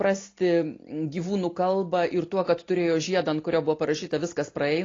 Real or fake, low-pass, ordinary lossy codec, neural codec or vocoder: real; 7.2 kHz; AAC, 32 kbps; none